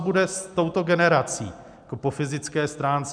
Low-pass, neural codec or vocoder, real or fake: 9.9 kHz; none; real